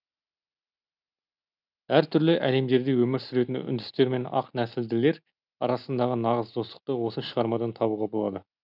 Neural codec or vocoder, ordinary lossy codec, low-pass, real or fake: none; none; 5.4 kHz; real